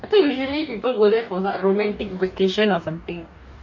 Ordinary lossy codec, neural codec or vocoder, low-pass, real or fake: none; codec, 44.1 kHz, 2.6 kbps, DAC; 7.2 kHz; fake